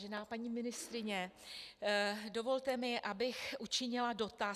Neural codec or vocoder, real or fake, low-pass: none; real; 14.4 kHz